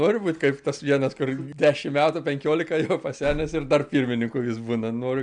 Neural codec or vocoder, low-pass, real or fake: none; 9.9 kHz; real